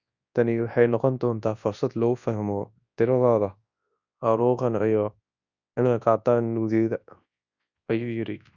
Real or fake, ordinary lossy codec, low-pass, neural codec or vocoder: fake; Opus, 64 kbps; 7.2 kHz; codec, 24 kHz, 0.9 kbps, WavTokenizer, large speech release